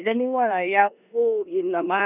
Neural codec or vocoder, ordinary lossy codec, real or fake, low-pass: codec, 16 kHz in and 24 kHz out, 0.9 kbps, LongCat-Audio-Codec, four codebook decoder; none; fake; 3.6 kHz